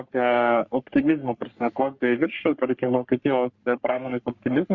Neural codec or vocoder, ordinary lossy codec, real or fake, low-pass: codec, 44.1 kHz, 3.4 kbps, Pupu-Codec; Opus, 64 kbps; fake; 7.2 kHz